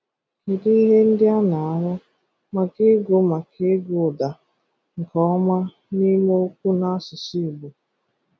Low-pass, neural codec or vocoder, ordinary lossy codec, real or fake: none; none; none; real